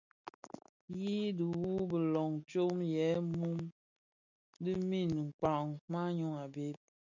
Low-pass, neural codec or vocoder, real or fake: 7.2 kHz; none; real